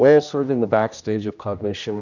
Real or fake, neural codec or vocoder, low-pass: fake; codec, 16 kHz, 1 kbps, X-Codec, HuBERT features, trained on general audio; 7.2 kHz